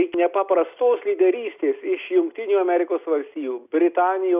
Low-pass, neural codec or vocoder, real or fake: 3.6 kHz; none; real